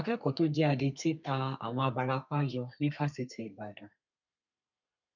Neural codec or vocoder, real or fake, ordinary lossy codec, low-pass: codec, 32 kHz, 1.9 kbps, SNAC; fake; none; 7.2 kHz